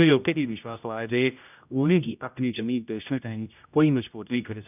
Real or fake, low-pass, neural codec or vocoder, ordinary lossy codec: fake; 3.6 kHz; codec, 16 kHz, 0.5 kbps, X-Codec, HuBERT features, trained on general audio; none